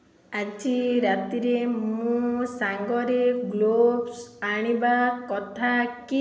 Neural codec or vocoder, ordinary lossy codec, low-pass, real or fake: none; none; none; real